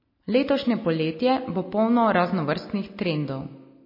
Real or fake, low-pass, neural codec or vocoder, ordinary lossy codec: real; 5.4 kHz; none; MP3, 24 kbps